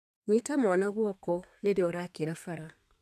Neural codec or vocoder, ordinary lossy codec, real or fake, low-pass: codec, 32 kHz, 1.9 kbps, SNAC; none; fake; 14.4 kHz